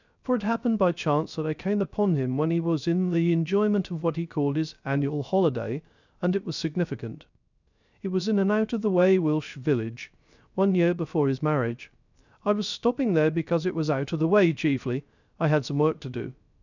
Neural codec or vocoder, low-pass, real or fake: codec, 16 kHz, 0.3 kbps, FocalCodec; 7.2 kHz; fake